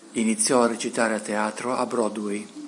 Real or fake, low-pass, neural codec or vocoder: real; 10.8 kHz; none